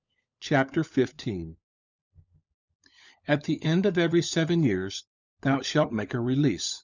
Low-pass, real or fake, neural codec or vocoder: 7.2 kHz; fake; codec, 16 kHz, 16 kbps, FunCodec, trained on LibriTTS, 50 frames a second